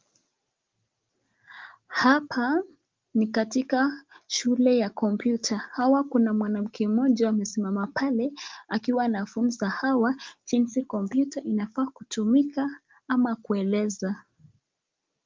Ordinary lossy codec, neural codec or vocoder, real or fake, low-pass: Opus, 32 kbps; none; real; 7.2 kHz